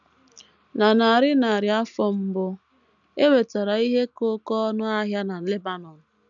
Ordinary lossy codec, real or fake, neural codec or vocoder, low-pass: none; real; none; 7.2 kHz